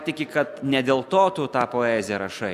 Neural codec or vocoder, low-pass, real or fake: none; 14.4 kHz; real